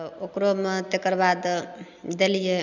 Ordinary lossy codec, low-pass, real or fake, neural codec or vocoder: none; 7.2 kHz; real; none